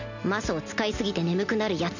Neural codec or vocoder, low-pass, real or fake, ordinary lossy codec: none; 7.2 kHz; real; none